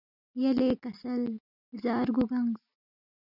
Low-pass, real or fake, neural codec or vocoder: 5.4 kHz; real; none